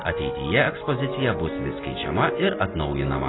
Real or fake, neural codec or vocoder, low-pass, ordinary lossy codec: real; none; 7.2 kHz; AAC, 16 kbps